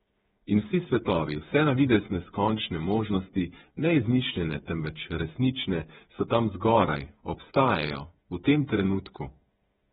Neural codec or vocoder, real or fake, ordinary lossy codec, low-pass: codec, 16 kHz, 8 kbps, FreqCodec, smaller model; fake; AAC, 16 kbps; 7.2 kHz